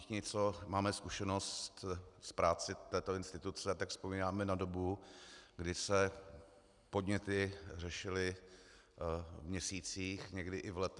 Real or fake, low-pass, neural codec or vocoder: real; 10.8 kHz; none